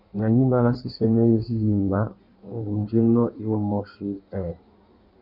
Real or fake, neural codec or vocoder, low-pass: fake; codec, 16 kHz in and 24 kHz out, 1.1 kbps, FireRedTTS-2 codec; 5.4 kHz